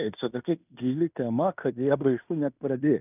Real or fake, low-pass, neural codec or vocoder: fake; 3.6 kHz; codec, 16 kHz in and 24 kHz out, 0.9 kbps, LongCat-Audio-Codec, fine tuned four codebook decoder